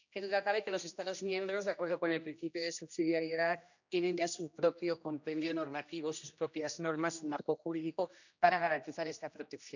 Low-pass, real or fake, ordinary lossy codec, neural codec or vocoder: 7.2 kHz; fake; none; codec, 16 kHz, 1 kbps, X-Codec, HuBERT features, trained on general audio